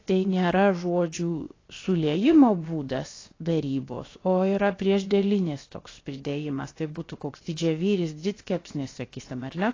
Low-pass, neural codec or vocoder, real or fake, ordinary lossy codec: 7.2 kHz; codec, 16 kHz, 0.7 kbps, FocalCodec; fake; AAC, 32 kbps